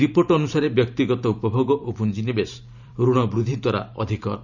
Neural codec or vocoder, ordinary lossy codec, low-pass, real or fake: vocoder, 44.1 kHz, 128 mel bands every 512 samples, BigVGAN v2; none; 7.2 kHz; fake